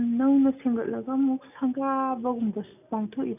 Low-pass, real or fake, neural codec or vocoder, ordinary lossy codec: 3.6 kHz; real; none; none